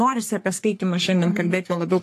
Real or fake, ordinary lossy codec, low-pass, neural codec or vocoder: fake; AAC, 64 kbps; 14.4 kHz; codec, 44.1 kHz, 3.4 kbps, Pupu-Codec